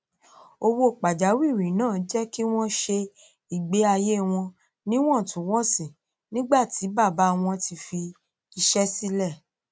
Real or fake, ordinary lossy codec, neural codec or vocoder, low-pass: real; none; none; none